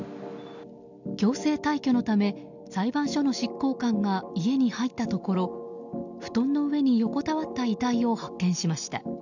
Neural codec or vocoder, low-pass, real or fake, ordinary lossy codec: none; 7.2 kHz; real; none